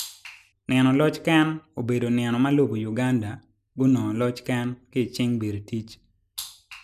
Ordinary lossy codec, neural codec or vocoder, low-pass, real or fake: none; none; 14.4 kHz; real